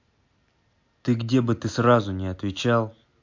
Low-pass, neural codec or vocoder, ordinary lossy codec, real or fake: 7.2 kHz; none; MP3, 48 kbps; real